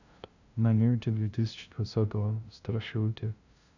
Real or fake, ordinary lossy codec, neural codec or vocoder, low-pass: fake; none; codec, 16 kHz, 0.5 kbps, FunCodec, trained on LibriTTS, 25 frames a second; 7.2 kHz